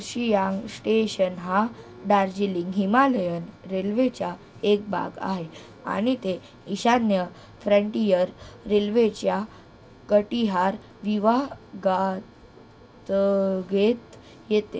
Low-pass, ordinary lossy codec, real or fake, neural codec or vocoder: none; none; real; none